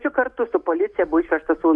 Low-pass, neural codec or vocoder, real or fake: 10.8 kHz; none; real